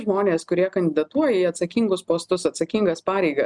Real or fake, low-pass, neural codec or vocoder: real; 10.8 kHz; none